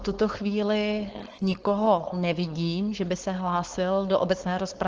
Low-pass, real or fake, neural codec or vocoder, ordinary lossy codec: 7.2 kHz; fake; codec, 16 kHz, 4.8 kbps, FACodec; Opus, 16 kbps